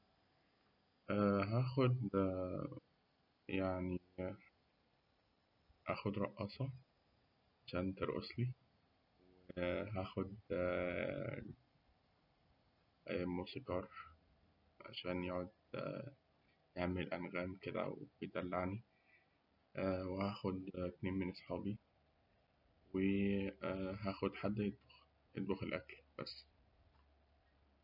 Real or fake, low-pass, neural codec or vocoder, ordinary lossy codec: real; 5.4 kHz; none; none